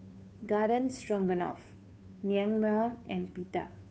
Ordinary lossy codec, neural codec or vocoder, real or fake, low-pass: none; codec, 16 kHz, 2 kbps, FunCodec, trained on Chinese and English, 25 frames a second; fake; none